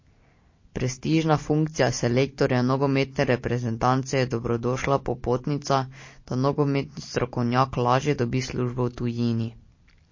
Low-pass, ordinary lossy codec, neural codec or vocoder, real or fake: 7.2 kHz; MP3, 32 kbps; none; real